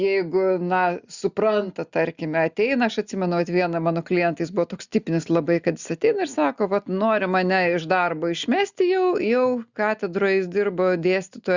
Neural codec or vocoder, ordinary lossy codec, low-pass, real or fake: none; Opus, 64 kbps; 7.2 kHz; real